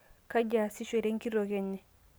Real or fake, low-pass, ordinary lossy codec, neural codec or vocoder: real; none; none; none